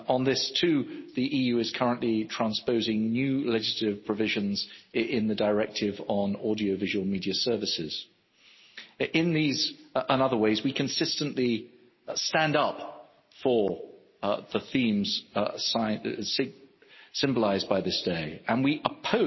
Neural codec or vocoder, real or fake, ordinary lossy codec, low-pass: none; real; MP3, 24 kbps; 7.2 kHz